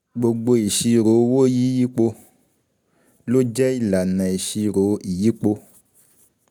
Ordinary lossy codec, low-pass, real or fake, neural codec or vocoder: none; 19.8 kHz; real; none